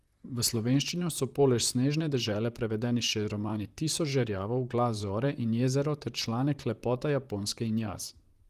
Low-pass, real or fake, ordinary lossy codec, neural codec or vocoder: 14.4 kHz; fake; Opus, 32 kbps; vocoder, 44.1 kHz, 128 mel bands, Pupu-Vocoder